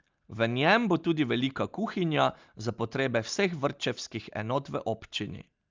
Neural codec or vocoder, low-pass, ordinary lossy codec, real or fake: none; 7.2 kHz; Opus, 24 kbps; real